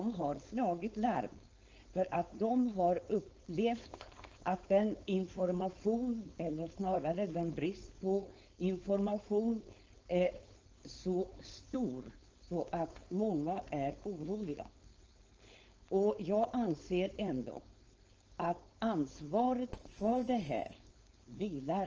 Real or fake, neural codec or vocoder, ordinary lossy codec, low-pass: fake; codec, 16 kHz, 4.8 kbps, FACodec; Opus, 24 kbps; 7.2 kHz